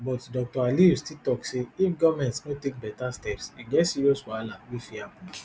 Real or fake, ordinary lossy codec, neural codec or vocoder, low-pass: real; none; none; none